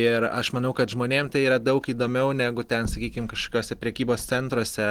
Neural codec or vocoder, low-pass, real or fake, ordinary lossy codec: none; 19.8 kHz; real; Opus, 16 kbps